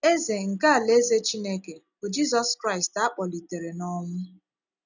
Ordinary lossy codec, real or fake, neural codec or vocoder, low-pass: none; real; none; 7.2 kHz